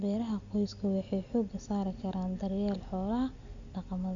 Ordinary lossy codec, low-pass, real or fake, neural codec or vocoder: Opus, 64 kbps; 7.2 kHz; real; none